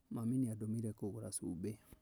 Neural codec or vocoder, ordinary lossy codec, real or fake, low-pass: none; none; real; none